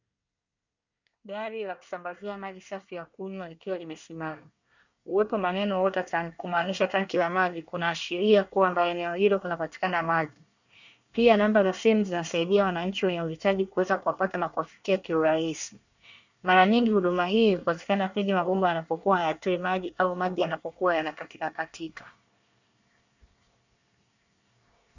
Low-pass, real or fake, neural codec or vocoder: 7.2 kHz; fake; codec, 24 kHz, 1 kbps, SNAC